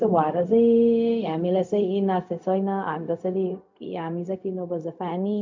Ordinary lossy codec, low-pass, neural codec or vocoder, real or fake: MP3, 64 kbps; 7.2 kHz; codec, 16 kHz, 0.4 kbps, LongCat-Audio-Codec; fake